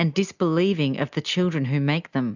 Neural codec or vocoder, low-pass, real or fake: none; 7.2 kHz; real